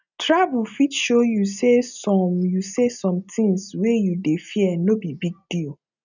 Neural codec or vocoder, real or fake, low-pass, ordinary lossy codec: none; real; 7.2 kHz; none